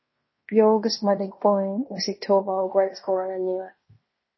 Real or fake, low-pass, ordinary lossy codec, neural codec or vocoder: fake; 7.2 kHz; MP3, 24 kbps; codec, 16 kHz in and 24 kHz out, 0.9 kbps, LongCat-Audio-Codec, fine tuned four codebook decoder